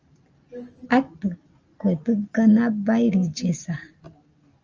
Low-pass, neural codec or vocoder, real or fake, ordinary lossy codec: 7.2 kHz; none; real; Opus, 24 kbps